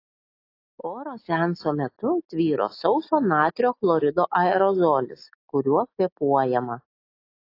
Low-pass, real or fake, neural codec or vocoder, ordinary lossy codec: 5.4 kHz; real; none; AAC, 32 kbps